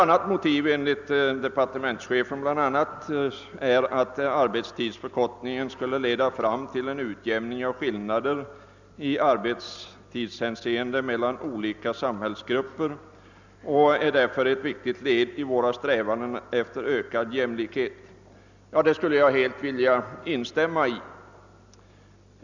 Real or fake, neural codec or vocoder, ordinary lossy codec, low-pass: real; none; none; 7.2 kHz